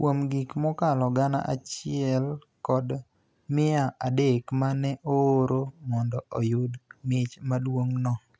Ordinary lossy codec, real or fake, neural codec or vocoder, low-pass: none; real; none; none